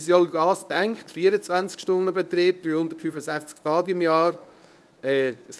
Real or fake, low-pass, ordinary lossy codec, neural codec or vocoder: fake; none; none; codec, 24 kHz, 0.9 kbps, WavTokenizer, medium speech release version 1